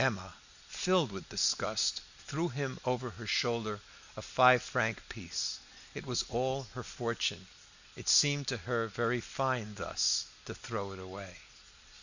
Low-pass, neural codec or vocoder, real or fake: 7.2 kHz; none; real